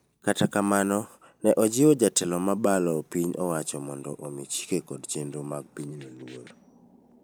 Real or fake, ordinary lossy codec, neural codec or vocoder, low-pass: fake; none; vocoder, 44.1 kHz, 128 mel bands every 512 samples, BigVGAN v2; none